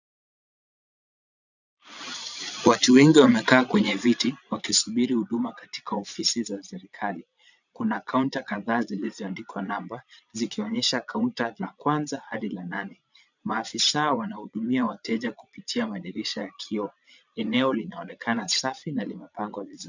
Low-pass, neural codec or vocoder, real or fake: 7.2 kHz; vocoder, 22.05 kHz, 80 mel bands, Vocos; fake